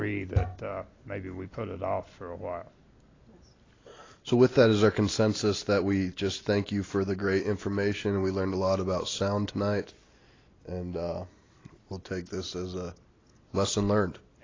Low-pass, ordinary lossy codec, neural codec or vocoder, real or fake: 7.2 kHz; AAC, 32 kbps; none; real